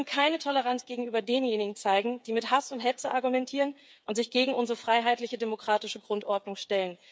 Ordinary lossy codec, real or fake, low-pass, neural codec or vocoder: none; fake; none; codec, 16 kHz, 8 kbps, FreqCodec, smaller model